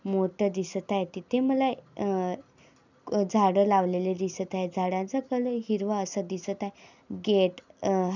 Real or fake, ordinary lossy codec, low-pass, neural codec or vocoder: real; none; 7.2 kHz; none